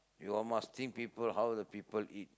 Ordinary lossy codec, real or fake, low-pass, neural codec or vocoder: none; real; none; none